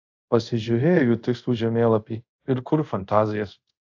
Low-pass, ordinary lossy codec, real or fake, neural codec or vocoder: 7.2 kHz; AAC, 48 kbps; fake; codec, 24 kHz, 0.5 kbps, DualCodec